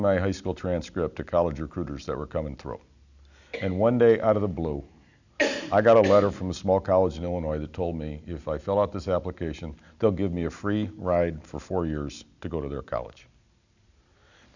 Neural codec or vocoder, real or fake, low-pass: none; real; 7.2 kHz